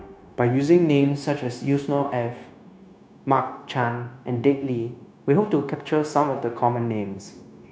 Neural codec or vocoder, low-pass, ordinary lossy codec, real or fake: codec, 16 kHz, 0.9 kbps, LongCat-Audio-Codec; none; none; fake